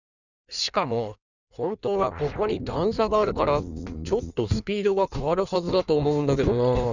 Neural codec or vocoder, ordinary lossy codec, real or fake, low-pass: codec, 16 kHz in and 24 kHz out, 1.1 kbps, FireRedTTS-2 codec; none; fake; 7.2 kHz